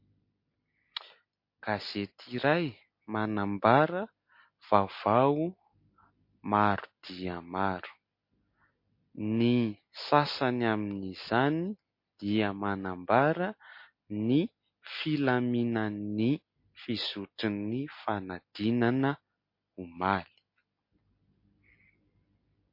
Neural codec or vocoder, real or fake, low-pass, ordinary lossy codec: none; real; 5.4 kHz; MP3, 32 kbps